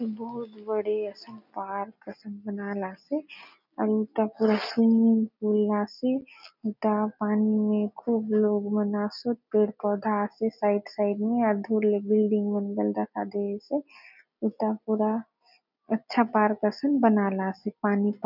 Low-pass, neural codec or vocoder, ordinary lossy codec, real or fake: 5.4 kHz; none; none; real